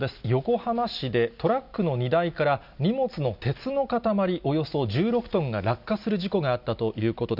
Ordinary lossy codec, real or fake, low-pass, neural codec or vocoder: none; real; 5.4 kHz; none